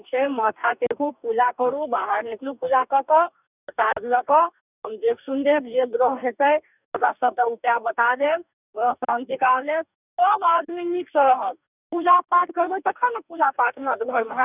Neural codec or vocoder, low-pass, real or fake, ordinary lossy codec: codec, 44.1 kHz, 2.6 kbps, DAC; 3.6 kHz; fake; none